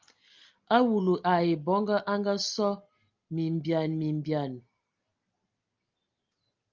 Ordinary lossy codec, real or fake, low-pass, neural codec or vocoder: Opus, 24 kbps; real; 7.2 kHz; none